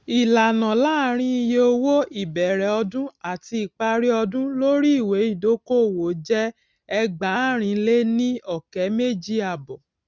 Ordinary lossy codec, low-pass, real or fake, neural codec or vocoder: none; none; real; none